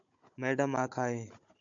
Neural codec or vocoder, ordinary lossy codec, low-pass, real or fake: codec, 16 kHz, 16 kbps, FunCodec, trained on Chinese and English, 50 frames a second; MP3, 64 kbps; 7.2 kHz; fake